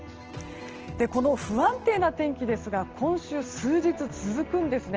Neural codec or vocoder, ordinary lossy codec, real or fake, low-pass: none; Opus, 16 kbps; real; 7.2 kHz